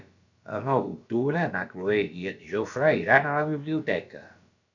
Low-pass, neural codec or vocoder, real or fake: 7.2 kHz; codec, 16 kHz, about 1 kbps, DyCAST, with the encoder's durations; fake